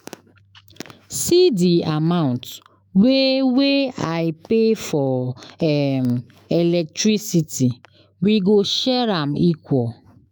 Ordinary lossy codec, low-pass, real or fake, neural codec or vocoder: none; none; fake; autoencoder, 48 kHz, 128 numbers a frame, DAC-VAE, trained on Japanese speech